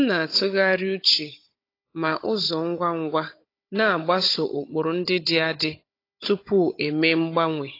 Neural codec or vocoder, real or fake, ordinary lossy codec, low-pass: codec, 16 kHz, 16 kbps, FunCodec, trained on Chinese and English, 50 frames a second; fake; AAC, 32 kbps; 5.4 kHz